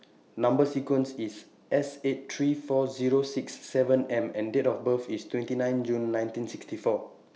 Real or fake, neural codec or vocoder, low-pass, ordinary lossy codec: real; none; none; none